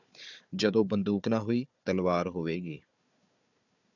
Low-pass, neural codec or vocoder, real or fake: 7.2 kHz; codec, 16 kHz, 4 kbps, FunCodec, trained on Chinese and English, 50 frames a second; fake